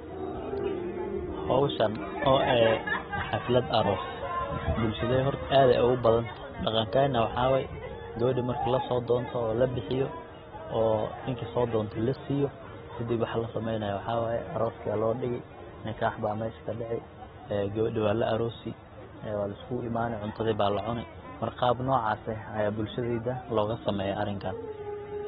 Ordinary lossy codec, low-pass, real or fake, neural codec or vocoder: AAC, 16 kbps; 19.8 kHz; real; none